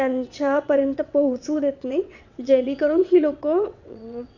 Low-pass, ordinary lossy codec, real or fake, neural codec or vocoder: 7.2 kHz; none; fake; vocoder, 22.05 kHz, 80 mel bands, WaveNeXt